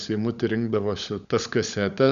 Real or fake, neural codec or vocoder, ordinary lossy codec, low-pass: fake; codec, 16 kHz, 16 kbps, FunCodec, trained on LibriTTS, 50 frames a second; Opus, 64 kbps; 7.2 kHz